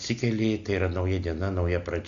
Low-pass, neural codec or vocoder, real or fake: 7.2 kHz; none; real